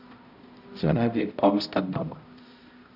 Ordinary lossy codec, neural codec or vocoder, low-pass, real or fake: none; codec, 16 kHz, 0.5 kbps, X-Codec, HuBERT features, trained on balanced general audio; 5.4 kHz; fake